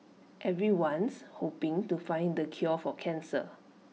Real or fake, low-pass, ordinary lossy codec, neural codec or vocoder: real; none; none; none